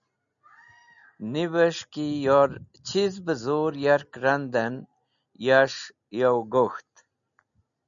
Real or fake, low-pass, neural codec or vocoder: real; 7.2 kHz; none